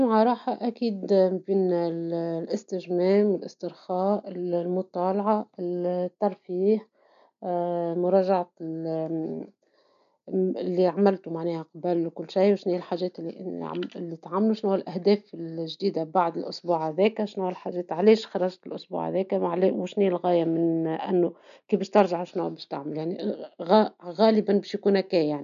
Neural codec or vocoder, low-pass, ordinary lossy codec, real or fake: none; 7.2 kHz; none; real